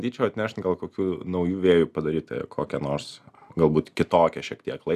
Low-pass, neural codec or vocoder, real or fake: 14.4 kHz; none; real